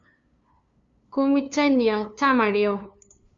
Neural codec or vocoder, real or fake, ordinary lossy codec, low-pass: codec, 16 kHz, 2 kbps, FunCodec, trained on LibriTTS, 25 frames a second; fake; Opus, 64 kbps; 7.2 kHz